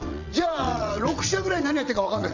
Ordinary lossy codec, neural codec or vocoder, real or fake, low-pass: none; vocoder, 22.05 kHz, 80 mel bands, WaveNeXt; fake; 7.2 kHz